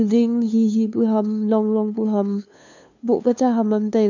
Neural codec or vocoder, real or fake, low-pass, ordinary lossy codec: codec, 16 kHz, 2 kbps, FunCodec, trained on LibriTTS, 25 frames a second; fake; 7.2 kHz; none